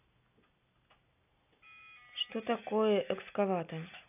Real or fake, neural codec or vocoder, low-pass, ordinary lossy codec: real; none; 3.6 kHz; none